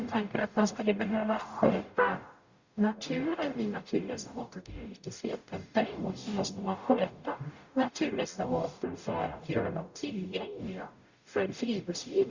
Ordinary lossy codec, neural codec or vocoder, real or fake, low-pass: Opus, 64 kbps; codec, 44.1 kHz, 0.9 kbps, DAC; fake; 7.2 kHz